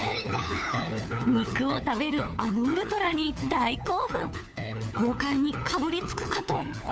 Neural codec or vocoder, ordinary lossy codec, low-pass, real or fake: codec, 16 kHz, 4 kbps, FunCodec, trained on LibriTTS, 50 frames a second; none; none; fake